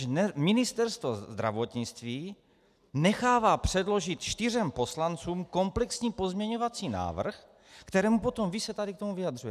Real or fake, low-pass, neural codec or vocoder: real; 14.4 kHz; none